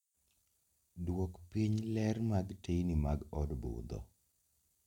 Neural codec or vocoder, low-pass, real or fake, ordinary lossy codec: none; 19.8 kHz; real; none